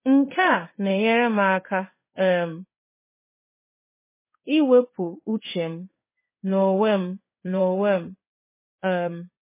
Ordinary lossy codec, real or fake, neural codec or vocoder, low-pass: MP3, 16 kbps; fake; codec, 16 kHz in and 24 kHz out, 1 kbps, XY-Tokenizer; 3.6 kHz